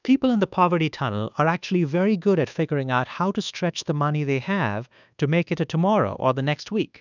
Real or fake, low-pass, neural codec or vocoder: fake; 7.2 kHz; codec, 24 kHz, 1.2 kbps, DualCodec